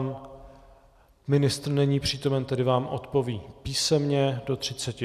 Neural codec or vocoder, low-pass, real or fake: none; 14.4 kHz; real